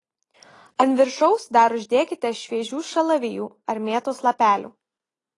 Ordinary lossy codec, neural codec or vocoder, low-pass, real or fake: AAC, 32 kbps; none; 10.8 kHz; real